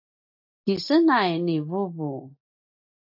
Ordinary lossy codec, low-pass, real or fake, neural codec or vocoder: AAC, 48 kbps; 5.4 kHz; real; none